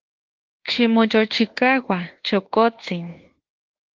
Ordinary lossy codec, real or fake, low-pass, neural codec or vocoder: Opus, 16 kbps; fake; 7.2 kHz; codec, 24 kHz, 1.2 kbps, DualCodec